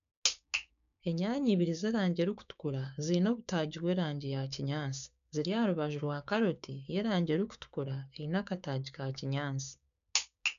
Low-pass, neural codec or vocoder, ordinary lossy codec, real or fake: 7.2 kHz; codec, 16 kHz, 6 kbps, DAC; none; fake